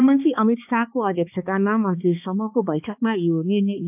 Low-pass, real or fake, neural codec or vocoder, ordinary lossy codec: 3.6 kHz; fake; codec, 16 kHz, 2 kbps, X-Codec, HuBERT features, trained on balanced general audio; AAC, 32 kbps